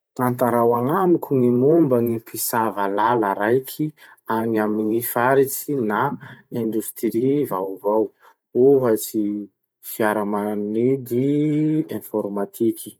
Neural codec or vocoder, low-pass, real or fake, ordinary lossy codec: vocoder, 44.1 kHz, 128 mel bands every 512 samples, BigVGAN v2; none; fake; none